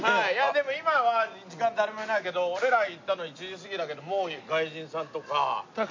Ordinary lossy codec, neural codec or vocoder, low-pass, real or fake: MP3, 48 kbps; none; 7.2 kHz; real